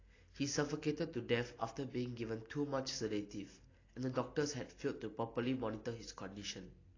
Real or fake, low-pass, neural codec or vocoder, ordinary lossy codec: real; 7.2 kHz; none; AAC, 32 kbps